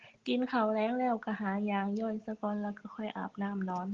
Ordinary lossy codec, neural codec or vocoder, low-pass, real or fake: Opus, 16 kbps; none; 7.2 kHz; real